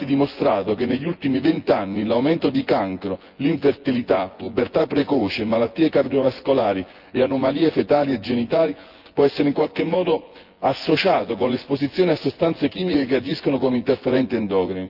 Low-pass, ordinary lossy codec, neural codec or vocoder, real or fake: 5.4 kHz; Opus, 32 kbps; vocoder, 24 kHz, 100 mel bands, Vocos; fake